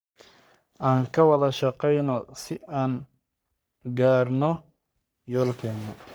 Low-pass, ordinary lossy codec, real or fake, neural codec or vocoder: none; none; fake; codec, 44.1 kHz, 3.4 kbps, Pupu-Codec